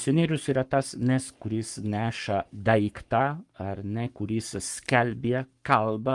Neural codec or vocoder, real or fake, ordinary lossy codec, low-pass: codec, 44.1 kHz, 7.8 kbps, Pupu-Codec; fake; Opus, 32 kbps; 10.8 kHz